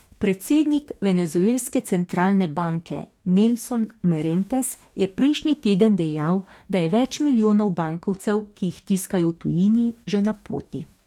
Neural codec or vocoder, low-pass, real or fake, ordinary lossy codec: codec, 44.1 kHz, 2.6 kbps, DAC; 19.8 kHz; fake; none